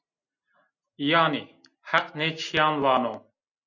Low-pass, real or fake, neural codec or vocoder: 7.2 kHz; real; none